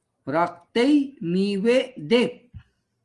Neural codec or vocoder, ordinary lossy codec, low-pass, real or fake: none; Opus, 24 kbps; 10.8 kHz; real